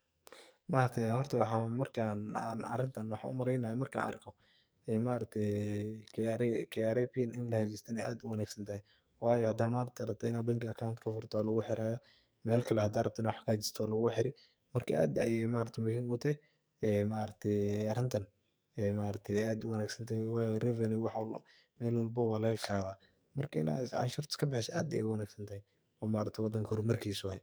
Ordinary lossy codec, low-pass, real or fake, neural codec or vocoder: none; none; fake; codec, 44.1 kHz, 2.6 kbps, SNAC